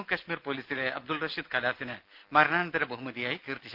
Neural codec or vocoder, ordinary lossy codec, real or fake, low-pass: none; Opus, 24 kbps; real; 5.4 kHz